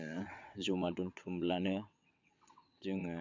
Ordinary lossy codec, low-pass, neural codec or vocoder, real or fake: MP3, 64 kbps; 7.2 kHz; vocoder, 22.05 kHz, 80 mel bands, WaveNeXt; fake